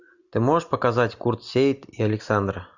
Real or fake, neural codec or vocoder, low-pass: real; none; 7.2 kHz